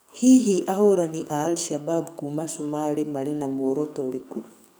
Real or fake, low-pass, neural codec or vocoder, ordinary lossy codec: fake; none; codec, 44.1 kHz, 2.6 kbps, SNAC; none